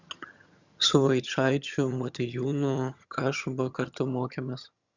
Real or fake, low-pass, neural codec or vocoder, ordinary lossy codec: fake; 7.2 kHz; vocoder, 22.05 kHz, 80 mel bands, HiFi-GAN; Opus, 64 kbps